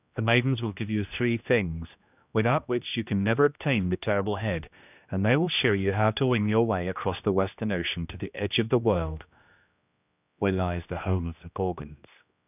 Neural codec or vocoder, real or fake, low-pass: codec, 16 kHz, 1 kbps, X-Codec, HuBERT features, trained on general audio; fake; 3.6 kHz